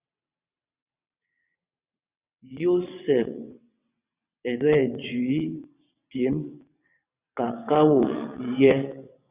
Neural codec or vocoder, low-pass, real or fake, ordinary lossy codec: vocoder, 22.05 kHz, 80 mel bands, WaveNeXt; 3.6 kHz; fake; Opus, 64 kbps